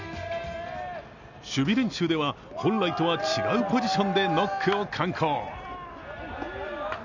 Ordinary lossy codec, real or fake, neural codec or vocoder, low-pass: none; real; none; 7.2 kHz